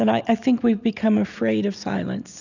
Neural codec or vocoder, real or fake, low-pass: none; real; 7.2 kHz